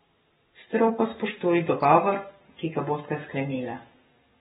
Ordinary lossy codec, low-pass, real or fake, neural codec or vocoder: AAC, 16 kbps; 19.8 kHz; fake; codec, 44.1 kHz, 7.8 kbps, Pupu-Codec